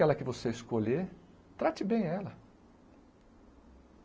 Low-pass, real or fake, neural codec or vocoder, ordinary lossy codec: none; real; none; none